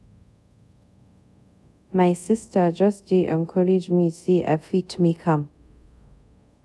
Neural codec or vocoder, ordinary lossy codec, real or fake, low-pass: codec, 24 kHz, 0.5 kbps, DualCodec; none; fake; none